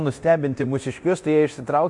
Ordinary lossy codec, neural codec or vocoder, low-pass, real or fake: MP3, 64 kbps; codec, 24 kHz, 0.9 kbps, DualCodec; 10.8 kHz; fake